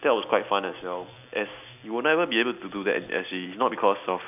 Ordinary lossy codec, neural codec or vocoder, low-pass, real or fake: none; none; 3.6 kHz; real